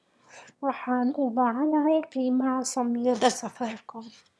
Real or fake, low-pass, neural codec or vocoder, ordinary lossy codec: fake; none; autoencoder, 22.05 kHz, a latent of 192 numbers a frame, VITS, trained on one speaker; none